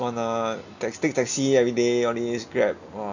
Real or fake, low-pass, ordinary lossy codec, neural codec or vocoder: real; 7.2 kHz; none; none